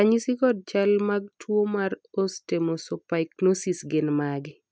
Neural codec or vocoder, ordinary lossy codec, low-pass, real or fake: none; none; none; real